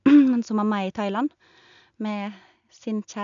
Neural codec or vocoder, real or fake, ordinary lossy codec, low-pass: none; real; none; 7.2 kHz